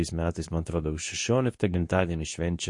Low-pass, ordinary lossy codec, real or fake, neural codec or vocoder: 10.8 kHz; MP3, 48 kbps; fake; codec, 24 kHz, 0.9 kbps, WavTokenizer, medium speech release version 2